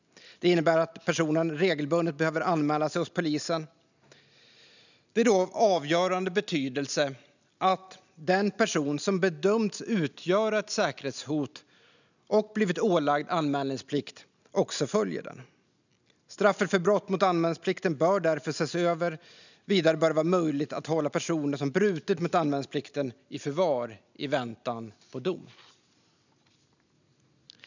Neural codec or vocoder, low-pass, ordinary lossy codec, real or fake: none; 7.2 kHz; none; real